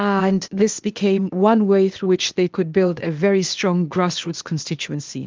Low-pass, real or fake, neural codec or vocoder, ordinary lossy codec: 7.2 kHz; fake; codec, 16 kHz, 0.8 kbps, ZipCodec; Opus, 32 kbps